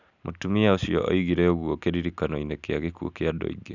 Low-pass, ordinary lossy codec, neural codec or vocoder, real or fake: 7.2 kHz; none; none; real